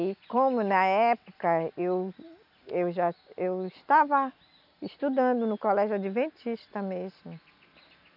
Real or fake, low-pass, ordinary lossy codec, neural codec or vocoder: real; 5.4 kHz; none; none